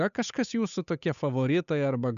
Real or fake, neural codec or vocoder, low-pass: fake; codec, 16 kHz, 8 kbps, FunCodec, trained on Chinese and English, 25 frames a second; 7.2 kHz